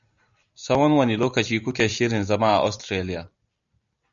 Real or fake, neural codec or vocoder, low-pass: real; none; 7.2 kHz